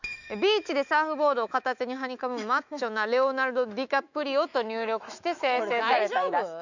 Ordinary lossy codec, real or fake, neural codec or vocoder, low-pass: none; fake; autoencoder, 48 kHz, 128 numbers a frame, DAC-VAE, trained on Japanese speech; 7.2 kHz